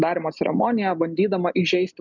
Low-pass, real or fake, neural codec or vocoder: 7.2 kHz; real; none